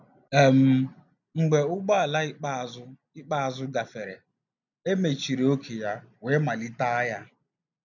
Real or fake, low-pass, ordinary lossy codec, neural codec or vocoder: real; 7.2 kHz; none; none